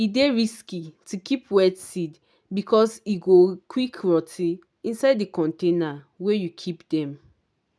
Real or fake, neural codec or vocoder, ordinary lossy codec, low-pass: real; none; none; none